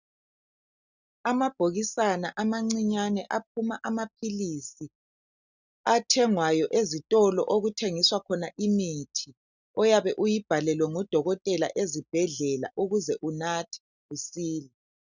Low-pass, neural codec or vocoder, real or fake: 7.2 kHz; none; real